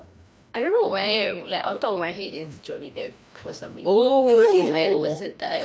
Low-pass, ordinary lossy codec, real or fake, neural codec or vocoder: none; none; fake; codec, 16 kHz, 1 kbps, FreqCodec, larger model